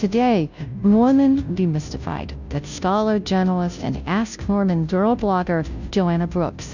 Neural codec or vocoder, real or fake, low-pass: codec, 16 kHz, 0.5 kbps, FunCodec, trained on Chinese and English, 25 frames a second; fake; 7.2 kHz